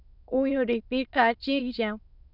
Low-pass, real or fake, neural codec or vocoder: 5.4 kHz; fake; autoencoder, 22.05 kHz, a latent of 192 numbers a frame, VITS, trained on many speakers